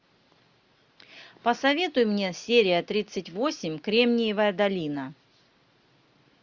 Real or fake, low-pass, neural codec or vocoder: real; 7.2 kHz; none